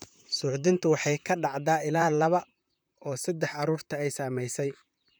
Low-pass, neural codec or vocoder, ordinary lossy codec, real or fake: none; vocoder, 44.1 kHz, 128 mel bands, Pupu-Vocoder; none; fake